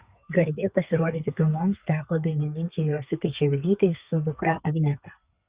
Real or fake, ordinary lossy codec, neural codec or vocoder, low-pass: fake; Opus, 64 kbps; codec, 32 kHz, 1.9 kbps, SNAC; 3.6 kHz